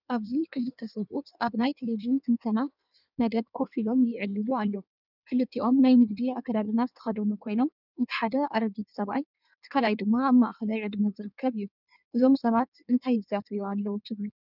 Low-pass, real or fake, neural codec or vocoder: 5.4 kHz; fake; codec, 16 kHz in and 24 kHz out, 1.1 kbps, FireRedTTS-2 codec